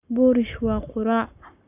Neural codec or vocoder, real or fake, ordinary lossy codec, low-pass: codec, 44.1 kHz, 7.8 kbps, Pupu-Codec; fake; none; 3.6 kHz